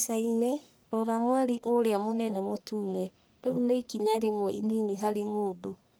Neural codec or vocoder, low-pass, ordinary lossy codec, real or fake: codec, 44.1 kHz, 1.7 kbps, Pupu-Codec; none; none; fake